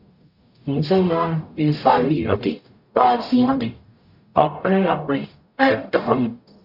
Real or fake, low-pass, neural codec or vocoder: fake; 5.4 kHz; codec, 44.1 kHz, 0.9 kbps, DAC